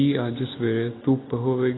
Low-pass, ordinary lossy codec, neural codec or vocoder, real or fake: 7.2 kHz; AAC, 16 kbps; none; real